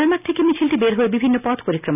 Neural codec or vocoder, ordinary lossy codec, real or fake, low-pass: none; none; real; 3.6 kHz